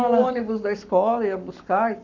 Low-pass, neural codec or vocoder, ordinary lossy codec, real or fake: 7.2 kHz; codec, 44.1 kHz, 7.8 kbps, Pupu-Codec; none; fake